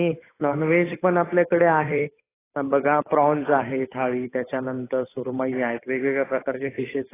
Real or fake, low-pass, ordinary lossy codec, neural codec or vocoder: fake; 3.6 kHz; AAC, 16 kbps; codec, 16 kHz, 8 kbps, FunCodec, trained on Chinese and English, 25 frames a second